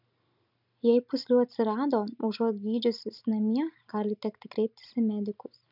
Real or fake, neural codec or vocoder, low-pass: real; none; 5.4 kHz